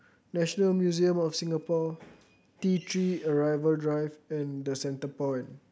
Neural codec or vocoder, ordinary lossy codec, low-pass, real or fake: none; none; none; real